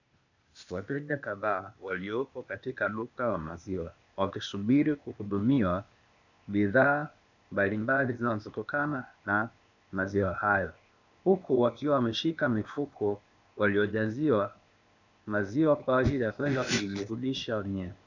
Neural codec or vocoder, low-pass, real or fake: codec, 16 kHz, 0.8 kbps, ZipCodec; 7.2 kHz; fake